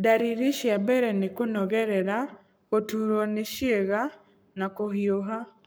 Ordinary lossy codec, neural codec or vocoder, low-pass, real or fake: none; codec, 44.1 kHz, 7.8 kbps, Pupu-Codec; none; fake